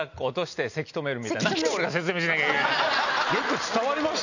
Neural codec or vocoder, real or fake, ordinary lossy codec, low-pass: none; real; none; 7.2 kHz